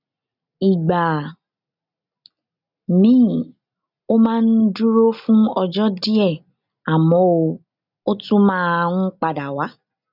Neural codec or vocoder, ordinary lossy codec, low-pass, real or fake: none; none; 5.4 kHz; real